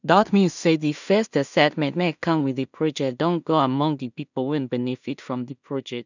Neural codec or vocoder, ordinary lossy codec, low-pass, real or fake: codec, 16 kHz in and 24 kHz out, 0.4 kbps, LongCat-Audio-Codec, two codebook decoder; none; 7.2 kHz; fake